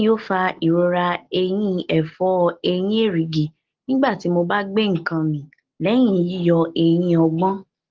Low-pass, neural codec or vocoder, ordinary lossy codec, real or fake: 7.2 kHz; none; Opus, 16 kbps; real